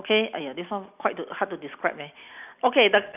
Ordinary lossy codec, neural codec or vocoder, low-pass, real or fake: none; none; 3.6 kHz; real